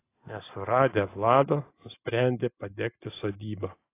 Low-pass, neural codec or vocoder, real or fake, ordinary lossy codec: 3.6 kHz; none; real; AAC, 24 kbps